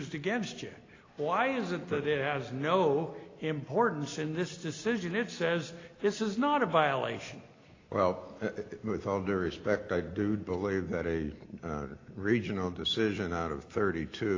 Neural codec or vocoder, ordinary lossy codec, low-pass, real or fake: none; AAC, 32 kbps; 7.2 kHz; real